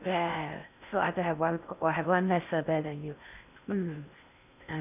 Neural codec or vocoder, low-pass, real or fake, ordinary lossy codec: codec, 16 kHz in and 24 kHz out, 0.6 kbps, FocalCodec, streaming, 4096 codes; 3.6 kHz; fake; none